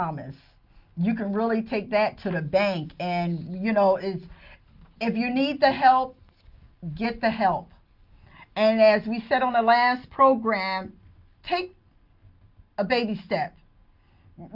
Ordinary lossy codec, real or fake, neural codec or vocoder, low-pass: Opus, 24 kbps; real; none; 5.4 kHz